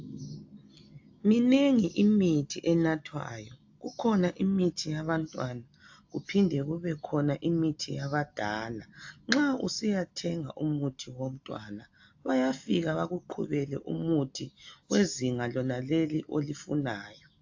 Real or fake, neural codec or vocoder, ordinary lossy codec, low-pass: real; none; AAC, 48 kbps; 7.2 kHz